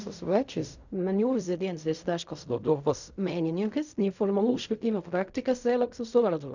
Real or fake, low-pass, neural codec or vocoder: fake; 7.2 kHz; codec, 16 kHz in and 24 kHz out, 0.4 kbps, LongCat-Audio-Codec, fine tuned four codebook decoder